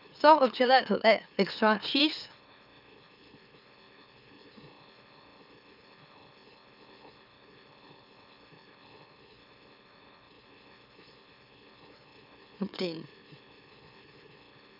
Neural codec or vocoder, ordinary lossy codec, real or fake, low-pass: autoencoder, 44.1 kHz, a latent of 192 numbers a frame, MeloTTS; none; fake; 5.4 kHz